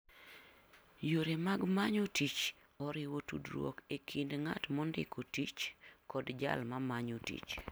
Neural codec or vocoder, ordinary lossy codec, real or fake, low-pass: none; none; real; none